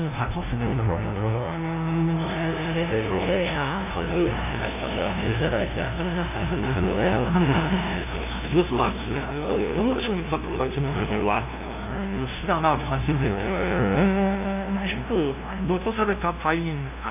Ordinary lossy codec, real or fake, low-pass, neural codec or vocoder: none; fake; 3.6 kHz; codec, 16 kHz, 0.5 kbps, FunCodec, trained on LibriTTS, 25 frames a second